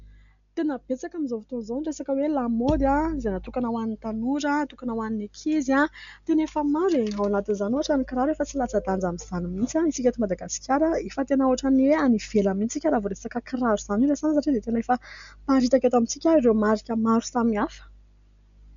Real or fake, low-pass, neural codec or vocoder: real; 7.2 kHz; none